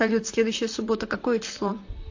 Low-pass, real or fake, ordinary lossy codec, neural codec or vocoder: 7.2 kHz; fake; AAC, 48 kbps; vocoder, 44.1 kHz, 128 mel bands, Pupu-Vocoder